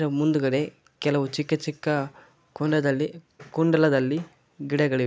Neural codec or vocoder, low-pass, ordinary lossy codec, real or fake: none; none; none; real